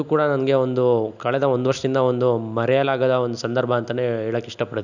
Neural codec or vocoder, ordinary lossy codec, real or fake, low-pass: none; none; real; 7.2 kHz